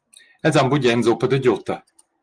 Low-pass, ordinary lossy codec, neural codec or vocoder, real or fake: 9.9 kHz; Opus, 24 kbps; none; real